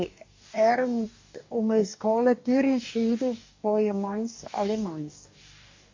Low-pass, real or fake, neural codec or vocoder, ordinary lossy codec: 7.2 kHz; fake; codec, 44.1 kHz, 2.6 kbps, DAC; MP3, 48 kbps